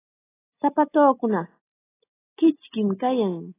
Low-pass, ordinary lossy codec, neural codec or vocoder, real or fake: 3.6 kHz; AAC, 16 kbps; none; real